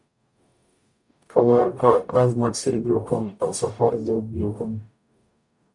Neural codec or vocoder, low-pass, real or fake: codec, 44.1 kHz, 0.9 kbps, DAC; 10.8 kHz; fake